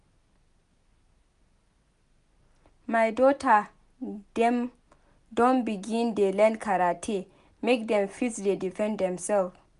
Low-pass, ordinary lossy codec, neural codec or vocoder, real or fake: 10.8 kHz; none; none; real